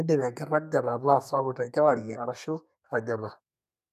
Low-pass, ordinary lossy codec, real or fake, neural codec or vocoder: 14.4 kHz; none; fake; codec, 32 kHz, 1.9 kbps, SNAC